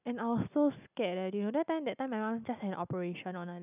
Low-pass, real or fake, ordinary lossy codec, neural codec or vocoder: 3.6 kHz; real; none; none